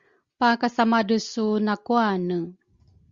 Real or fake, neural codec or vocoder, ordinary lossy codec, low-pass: real; none; Opus, 64 kbps; 7.2 kHz